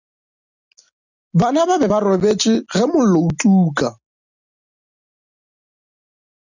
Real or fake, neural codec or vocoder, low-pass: real; none; 7.2 kHz